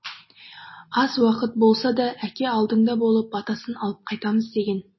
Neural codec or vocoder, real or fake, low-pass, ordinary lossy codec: none; real; 7.2 kHz; MP3, 24 kbps